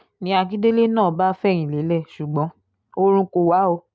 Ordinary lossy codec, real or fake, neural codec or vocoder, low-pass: none; real; none; none